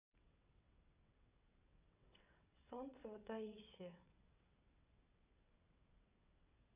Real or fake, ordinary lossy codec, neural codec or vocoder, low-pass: fake; none; vocoder, 22.05 kHz, 80 mel bands, WaveNeXt; 3.6 kHz